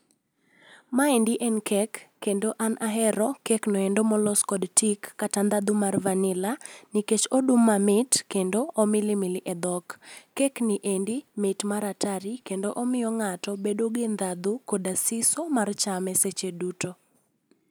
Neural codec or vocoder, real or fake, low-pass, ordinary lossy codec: none; real; none; none